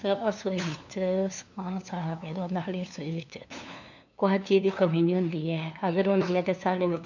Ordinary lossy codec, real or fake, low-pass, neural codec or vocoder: none; fake; 7.2 kHz; codec, 16 kHz, 2 kbps, FunCodec, trained on LibriTTS, 25 frames a second